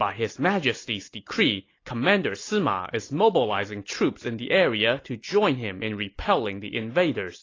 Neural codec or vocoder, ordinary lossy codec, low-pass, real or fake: none; AAC, 32 kbps; 7.2 kHz; real